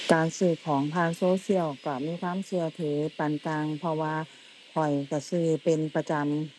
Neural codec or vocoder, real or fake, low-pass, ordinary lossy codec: none; real; none; none